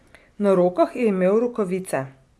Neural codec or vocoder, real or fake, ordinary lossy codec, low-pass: none; real; none; none